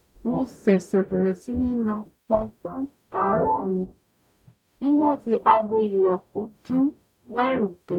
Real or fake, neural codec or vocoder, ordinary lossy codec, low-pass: fake; codec, 44.1 kHz, 0.9 kbps, DAC; none; 19.8 kHz